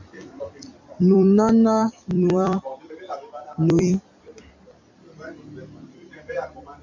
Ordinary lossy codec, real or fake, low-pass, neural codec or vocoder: MP3, 48 kbps; fake; 7.2 kHz; vocoder, 44.1 kHz, 128 mel bands every 256 samples, BigVGAN v2